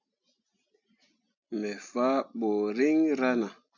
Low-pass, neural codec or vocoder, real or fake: 7.2 kHz; none; real